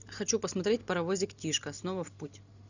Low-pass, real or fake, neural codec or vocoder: 7.2 kHz; real; none